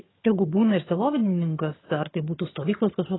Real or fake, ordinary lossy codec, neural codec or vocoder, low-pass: fake; AAC, 16 kbps; vocoder, 22.05 kHz, 80 mel bands, HiFi-GAN; 7.2 kHz